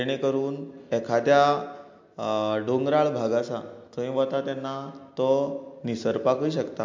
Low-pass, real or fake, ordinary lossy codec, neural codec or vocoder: 7.2 kHz; real; MP3, 48 kbps; none